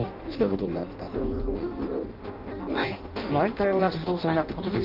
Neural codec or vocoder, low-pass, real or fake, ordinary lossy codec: codec, 16 kHz in and 24 kHz out, 0.6 kbps, FireRedTTS-2 codec; 5.4 kHz; fake; Opus, 32 kbps